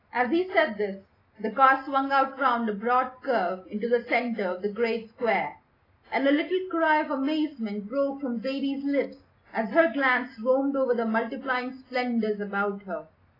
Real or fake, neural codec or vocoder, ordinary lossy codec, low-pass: real; none; AAC, 24 kbps; 5.4 kHz